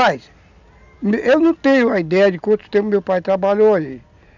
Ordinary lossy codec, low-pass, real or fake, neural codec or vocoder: none; 7.2 kHz; real; none